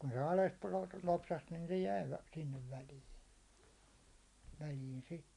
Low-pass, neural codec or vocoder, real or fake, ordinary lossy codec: 10.8 kHz; none; real; none